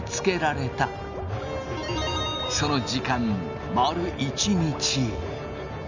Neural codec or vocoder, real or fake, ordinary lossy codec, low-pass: none; real; none; 7.2 kHz